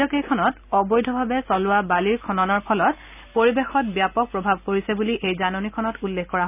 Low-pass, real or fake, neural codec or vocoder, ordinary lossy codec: 3.6 kHz; real; none; none